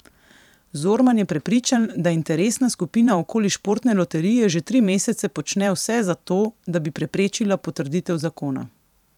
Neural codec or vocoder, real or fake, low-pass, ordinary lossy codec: vocoder, 44.1 kHz, 128 mel bands every 512 samples, BigVGAN v2; fake; 19.8 kHz; none